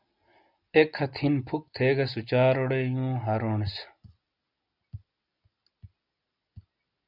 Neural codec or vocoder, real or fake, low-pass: none; real; 5.4 kHz